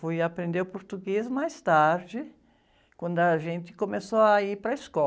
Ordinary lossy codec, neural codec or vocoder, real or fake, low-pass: none; none; real; none